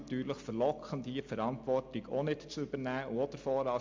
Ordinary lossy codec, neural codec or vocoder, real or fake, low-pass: MP3, 64 kbps; none; real; 7.2 kHz